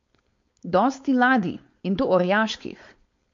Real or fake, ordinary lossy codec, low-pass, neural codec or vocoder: fake; MP3, 48 kbps; 7.2 kHz; codec, 16 kHz, 4.8 kbps, FACodec